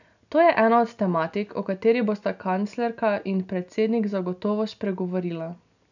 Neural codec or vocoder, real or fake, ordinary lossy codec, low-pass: none; real; none; 7.2 kHz